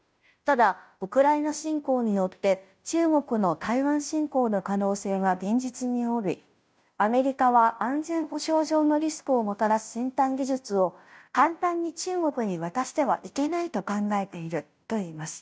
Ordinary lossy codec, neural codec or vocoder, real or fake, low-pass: none; codec, 16 kHz, 0.5 kbps, FunCodec, trained on Chinese and English, 25 frames a second; fake; none